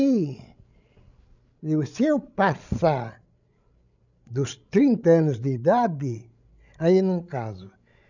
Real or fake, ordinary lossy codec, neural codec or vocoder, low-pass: fake; none; codec, 16 kHz, 16 kbps, FreqCodec, larger model; 7.2 kHz